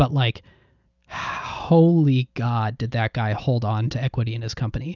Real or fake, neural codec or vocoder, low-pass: real; none; 7.2 kHz